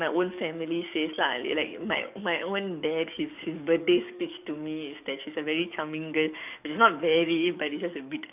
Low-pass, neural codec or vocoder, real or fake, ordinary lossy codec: 3.6 kHz; codec, 44.1 kHz, 7.8 kbps, DAC; fake; none